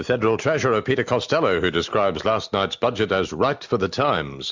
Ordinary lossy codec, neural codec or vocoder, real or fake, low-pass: MP3, 64 kbps; none; real; 7.2 kHz